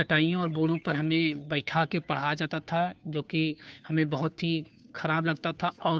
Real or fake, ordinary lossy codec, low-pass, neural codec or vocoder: fake; none; none; codec, 16 kHz, 2 kbps, FunCodec, trained on Chinese and English, 25 frames a second